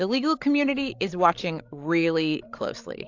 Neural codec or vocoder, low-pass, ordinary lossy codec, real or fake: codec, 16 kHz, 16 kbps, FreqCodec, larger model; 7.2 kHz; AAC, 48 kbps; fake